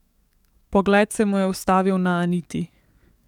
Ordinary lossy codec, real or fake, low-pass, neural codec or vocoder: none; fake; 19.8 kHz; codec, 44.1 kHz, 7.8 kbps, DAC